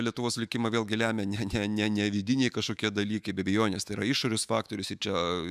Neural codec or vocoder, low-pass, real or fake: autoencoder, 48 kHz, 128 numbers a frame, DAC-VAE, trained on Japanese speech; 14.4 kHz; fake